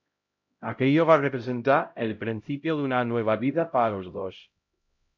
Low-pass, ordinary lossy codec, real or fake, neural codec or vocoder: 7.2 kHz; MP3, 64 kbps; fake; codec, 16 kHz, 0.5 kbps, X-Codec, HuBERT features, trained on LibriSpeech